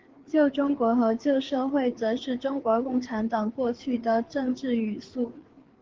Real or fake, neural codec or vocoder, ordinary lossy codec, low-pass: fake; codec, 16 kHz, 4 kbps, FreqCodec, larger model; Opus, 16 kbps; 7.2 kHz